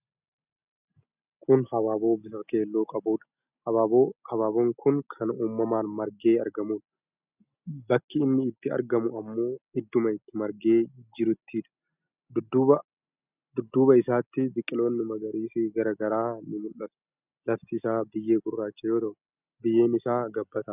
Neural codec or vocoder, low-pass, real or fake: none; 3.6 kHz; real